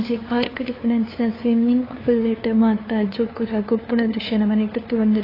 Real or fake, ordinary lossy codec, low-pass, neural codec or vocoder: fake; AAC, 24 kbps; 5.4 kHz; codec, 16 kHz, 2 kbps, FunCodec, trained on LibriTTS, 25 frames a second